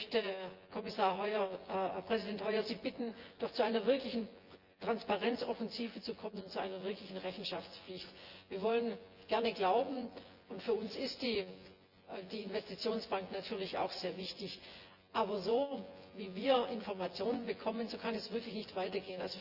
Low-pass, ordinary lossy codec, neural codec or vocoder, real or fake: 5.4 kHz; Opus, 32 kbps; vocoder, 24 kHz, 100 mel bands, Vocos; fake